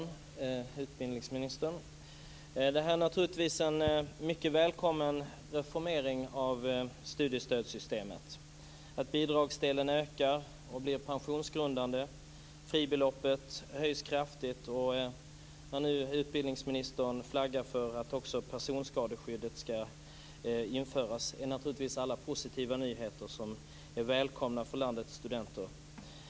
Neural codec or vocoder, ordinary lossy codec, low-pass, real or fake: none; none; none; real